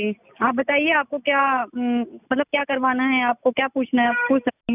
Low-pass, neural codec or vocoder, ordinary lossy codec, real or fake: 3.6 kHz; none; none; real